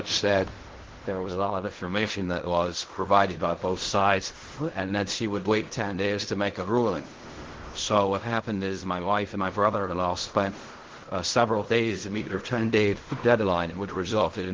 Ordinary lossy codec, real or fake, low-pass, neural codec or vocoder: Opus, 16 kbps; fake; 7.2 kHz; codec, 16 kHz in and 24 kHz out, 0.4 kbps, LongCat-Audio-Codec, fine tuned four codebook decoder